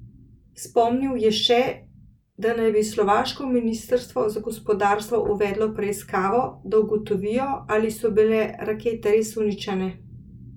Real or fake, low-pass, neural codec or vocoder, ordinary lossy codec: real; 19.8 kHz; none; none